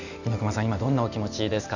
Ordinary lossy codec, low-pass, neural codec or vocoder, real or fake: none; 7.2 kHz; none; real